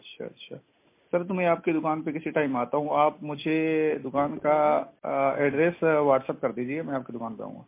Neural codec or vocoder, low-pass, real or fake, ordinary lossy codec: none; 3.6 kHz; real; MP3, 24 kbps